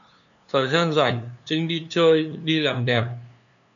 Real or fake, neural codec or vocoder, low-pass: fake; codec, 16 kHz, 2 kbps, FunCodec, trained on LibriTTS, 25 frames a second; 7.2 kHz